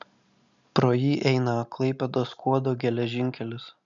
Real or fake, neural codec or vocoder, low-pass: real; none; 7.2 kHz